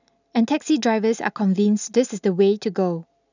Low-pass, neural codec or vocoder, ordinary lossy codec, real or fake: 7.2 kHz; none; none; real